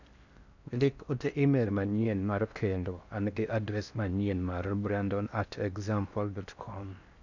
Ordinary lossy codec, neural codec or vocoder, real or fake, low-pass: none; codec, 16 kHz in and 24 kHz out, 0.6 kbps, FocalCodec, streaming, 4096 codes; fake; 7.2 kHz